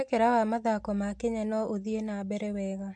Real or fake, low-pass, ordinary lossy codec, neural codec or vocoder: real; 9.9 kHz; MP3, 64 kbps; none